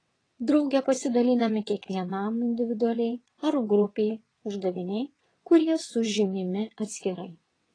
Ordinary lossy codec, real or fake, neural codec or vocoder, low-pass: AAC, 32 kbps; fake; vocoder, 22.05 kHz, 80 mel bands, WaveNeXt; 9.9 kHz